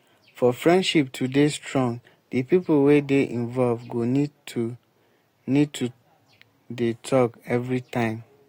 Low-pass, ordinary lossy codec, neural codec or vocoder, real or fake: 19.8 kHz; AAC, 48 kbps; none; real